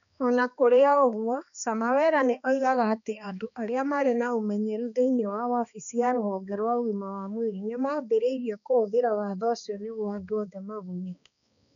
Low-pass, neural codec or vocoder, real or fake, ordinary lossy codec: 7.2 kHz; codec, 16 kHz, 2 kbps, X-Codec, HuBERT features, trained on balanced general audio; fake; none